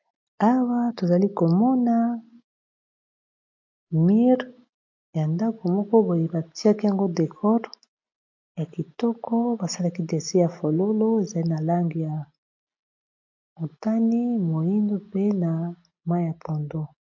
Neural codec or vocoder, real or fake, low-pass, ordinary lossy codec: none; real; 7.2 kHz; MP3, 48 kbps